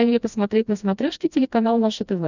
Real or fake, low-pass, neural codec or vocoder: fake; 7.2 kHz; codec, 16 kHz, 1 kbps, FreqCodec, smaller model